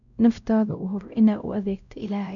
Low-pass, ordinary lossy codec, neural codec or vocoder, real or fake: 7.2 kHz; none; codec, 16 kHz, 0.5 kbps, X-Codec, WavLM features, trained on Multilingual LibriSpeech; fake